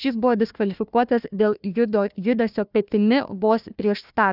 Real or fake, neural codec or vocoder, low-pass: fake; codec, 16 kHz, 1 kbps, FunCodec, trained on Chinese and English, 50 frames a second; 5.4 kHz